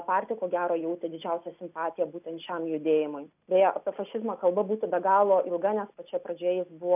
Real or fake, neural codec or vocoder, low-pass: real; none; 3.6 kHz